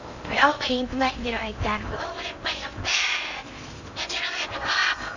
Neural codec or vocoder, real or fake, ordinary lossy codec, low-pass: codec, 16 kHz in and 24 kHz out, 0.8 kbps, FocalCodec, streaming, 65536 codes; fake; none; 7.2 kHz